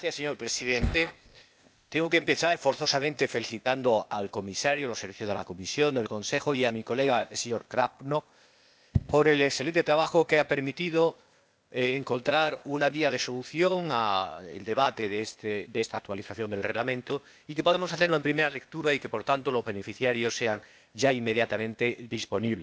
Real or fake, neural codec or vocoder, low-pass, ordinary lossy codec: fake; codec, 16 kHz, 0.8 kbps, ZipCodec; none; none